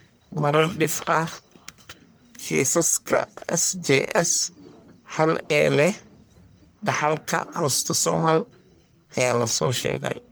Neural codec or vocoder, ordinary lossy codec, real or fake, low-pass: codec, 44.1 kHz, 1.7 kbps, Pupu-Codec; none; fake; none